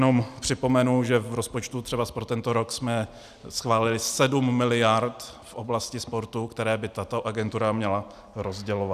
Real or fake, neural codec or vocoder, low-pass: real; none; 14.4 kHz